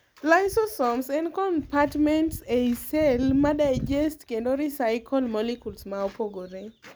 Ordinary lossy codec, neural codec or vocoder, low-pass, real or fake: none; none; none; real